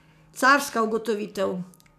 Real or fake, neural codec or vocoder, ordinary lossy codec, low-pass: fake; codec, 44.1 kHz, 7.8 kbps, DAC; none; 14.4 kHz